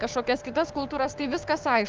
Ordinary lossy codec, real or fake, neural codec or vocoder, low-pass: Opus, 24 kbps; fake; codec, 16 kHz, 6 kbps, DAC; 7.2 kHz